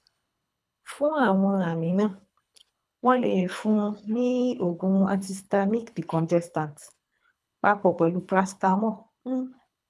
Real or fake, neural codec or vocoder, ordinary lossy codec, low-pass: fake; codec, 24 kHz, 3 kbps, HILCodec; none; none